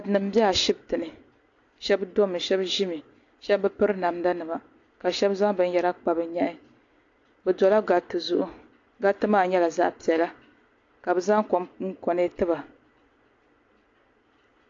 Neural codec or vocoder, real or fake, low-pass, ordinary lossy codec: none; real; 7.2 kHz; AAC, 48 kbps